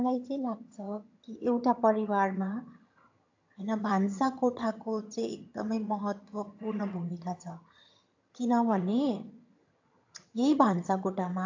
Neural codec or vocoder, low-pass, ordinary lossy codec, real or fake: vocoder, 22.05 kHz, 80 mel bands, HiFi-GAN; 7.2 kHz; AAC, 48 kbps; fake